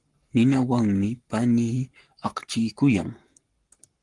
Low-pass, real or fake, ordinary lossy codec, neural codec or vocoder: 10.8 kHz; fake; Opus, 24 kbps; codec, 44.1 kHz, 7.8 kbps, Pupu-Codec